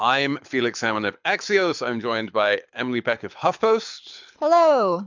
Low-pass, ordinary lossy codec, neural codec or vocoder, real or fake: 7.2 kHz; MP3, 64 kbps; codec, 24 kHz, 6 kbps, HILCodec; fake